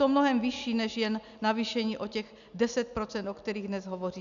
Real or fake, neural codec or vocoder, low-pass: real; none; 7.2 kHz